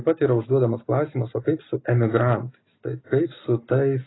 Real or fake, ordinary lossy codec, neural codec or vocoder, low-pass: real; AAC, 16 kbps; none; 7.2 kHz